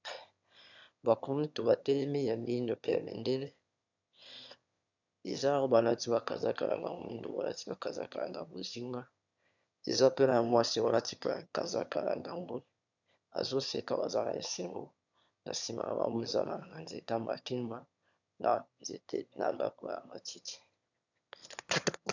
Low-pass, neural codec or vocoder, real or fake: 7.2 kHz; autoencoder, 22.05 kHz, a latent of 192 numbers a frame, VITS, trained on one speaker; fake